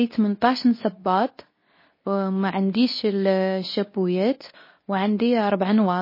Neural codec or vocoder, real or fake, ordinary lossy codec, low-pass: codec, 24 kHz, 0.9 kbps, WavTokenizer, medium speech release version 2; fake; MP3, 24 kbps; 5.4 kHz